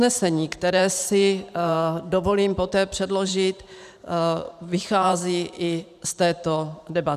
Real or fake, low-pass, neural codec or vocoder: fake; 14.4 kHz; vocoder, 44.1 kHz, 128 mel bands every 512 samples, BigVGAN v2